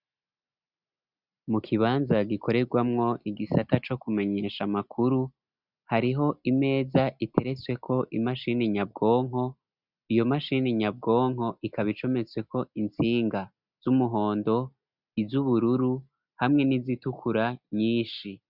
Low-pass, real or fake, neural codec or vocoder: 5.4 kHz; real; none